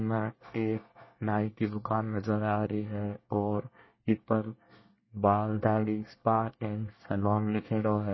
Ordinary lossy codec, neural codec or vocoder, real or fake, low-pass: MP3, 24 kbps; codec, 24 kHz, 1 kbps, SNAC; fake; 7.2 kHz